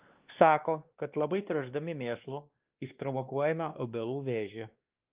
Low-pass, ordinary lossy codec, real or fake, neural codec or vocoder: 3.6 kHz; Opus, 32 kbps; fake; codec, 16 kHz, 2 kbps, X-Codec, WavLM features, trained on Multilingual LibriSpeech